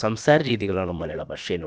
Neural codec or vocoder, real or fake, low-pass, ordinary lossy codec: codec, 16 kHz, about 1 kbps, DyCAST, with the encoder's durations; fake; none; none